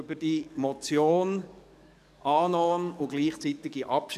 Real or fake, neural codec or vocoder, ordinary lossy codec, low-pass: fake; codec, 44.1 kHz, 7.8 kbps, DAC; none; 14.4 kHz